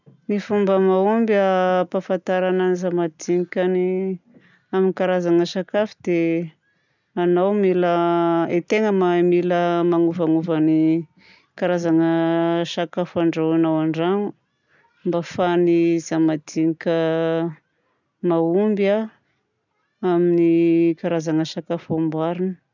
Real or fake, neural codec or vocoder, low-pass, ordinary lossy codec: real; none; 7.2 kHz; none